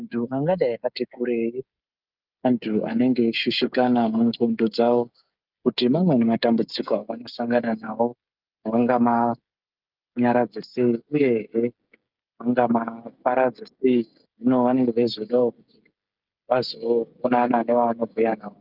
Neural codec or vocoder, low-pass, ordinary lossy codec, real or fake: codec, 16 kHz, 16 kbps, FreqCodec, smaller model; 5.4 kHz; Opus, 24 kbps; fake